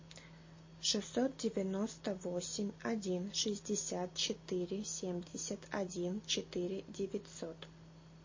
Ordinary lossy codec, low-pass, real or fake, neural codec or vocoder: MP3, 32 kbps; 7.2 kHz; real; none